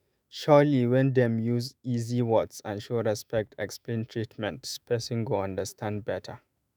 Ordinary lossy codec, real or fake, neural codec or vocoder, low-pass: none; fake; autoencoder, 48 kHz, 128 numbers a frame, DAC-VAE, trained on Japanese speech; none